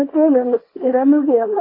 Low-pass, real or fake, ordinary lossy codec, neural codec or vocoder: 5.4 kHz; fake; AAC, 24 kbps; codec, 24 kHz, 0.9 kbps, WavTokenizer, small release